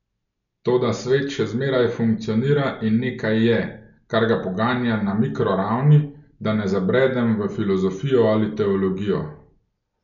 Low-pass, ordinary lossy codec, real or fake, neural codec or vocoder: 7.2 kHz; none; real; none